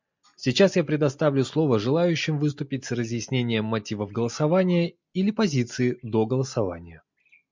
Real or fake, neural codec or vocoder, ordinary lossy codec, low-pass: real; none; MP3, 64 kbps; 7.2 kHz